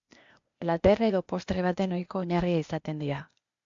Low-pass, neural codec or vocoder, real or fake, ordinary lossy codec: 7.2 kHz; codec, 16 kHz, 0.8 kbps, ZipCodec; fake; MP3, 64 kbps